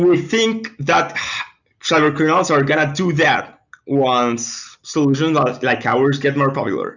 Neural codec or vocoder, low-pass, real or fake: none; 7.2 kHz; real